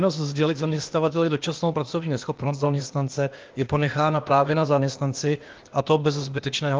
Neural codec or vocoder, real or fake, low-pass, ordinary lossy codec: codec, 16 kHz, 0.8 kbps, ZipCodec; fake; 7.2 kHz; Opus, 32 kbps